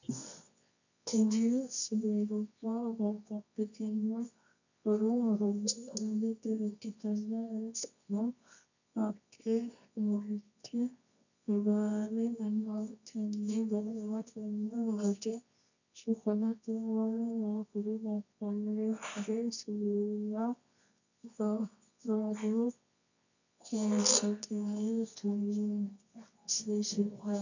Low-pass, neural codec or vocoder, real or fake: 7.2 kHz; codec, 24 kHz, 0.9 kbps, WavTokenizer, medium music audio release; fake